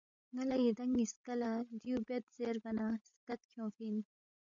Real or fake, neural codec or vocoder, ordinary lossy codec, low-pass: fake; codec, 16 kHz, 16 kbps, FreqCodec, larger model; MP3, 64 kbps; 7.2 kHz